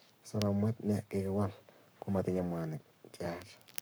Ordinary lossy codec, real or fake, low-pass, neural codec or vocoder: none; fake; none; codec, 44.1 kHz, 7.8 kbps, Pupu-Codec